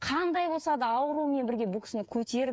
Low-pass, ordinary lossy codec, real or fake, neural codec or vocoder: none; none; fake; codec, 16 kHz, 8 kbps, FreqCodec, smaller model